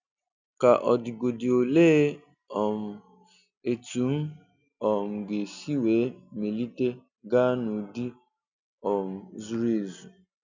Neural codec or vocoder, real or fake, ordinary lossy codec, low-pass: none; real; none; 7.2 kHz